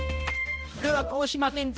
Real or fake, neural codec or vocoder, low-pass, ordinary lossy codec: fake; codec, 16 kHz, 0.5 kbps, X-Codec, HuBERT features, trained on balanced general audio; none; none